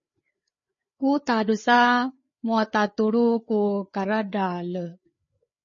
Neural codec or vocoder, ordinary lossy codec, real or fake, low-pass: codec, 16 kHz, 4 kbps, FreqCodec, larger model; MP3, 32 kbps; fake; 7.2 kHz